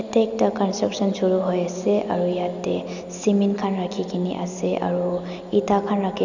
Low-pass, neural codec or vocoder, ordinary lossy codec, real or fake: 7.2 kHz; none; none; real